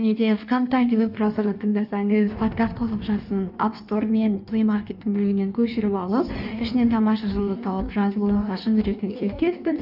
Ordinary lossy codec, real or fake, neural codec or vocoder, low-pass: none; fake; codec, 16 kHz in and 24 kHz out, 1.1 kbps, FireRedTTS-2 codec; 5.4 kHz